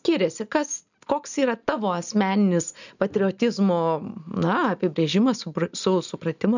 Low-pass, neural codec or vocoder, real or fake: 7.2 kHz; none; real